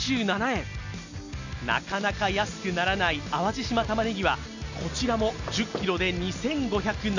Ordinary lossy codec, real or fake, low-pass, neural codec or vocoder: none; real; 7.2 kHz; none